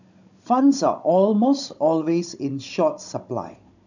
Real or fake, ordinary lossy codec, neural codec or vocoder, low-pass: fake; none; codec, 16 kHz, 16 kbps, FunCodec, trained on Chinese and English, 50 frames a second; 7.2 kHz